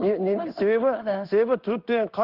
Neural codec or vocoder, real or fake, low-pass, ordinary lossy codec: codec, 16 kHz in and 24 kHz out, 1 kbps, XY-Tokenizer; fake; 5.4 kHz; Opus, 32 kbps